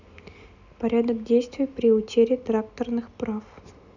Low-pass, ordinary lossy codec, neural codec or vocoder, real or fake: 7.2 kHz; none; none; real